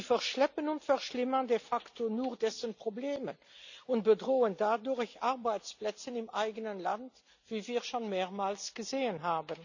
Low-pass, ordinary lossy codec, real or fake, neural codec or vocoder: 7.2 kHz; none; real; none